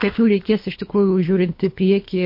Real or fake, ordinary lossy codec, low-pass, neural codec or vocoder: fake; MP3, 32 kbps; 5.4 kHz; codec, 24 kHz, 3 kbps, HILCodec